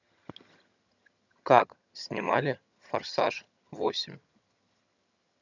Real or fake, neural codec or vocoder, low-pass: fake; vocoder, 22.05 kHz, 80 mel bands, HiFi-GAN; 7.2 kHz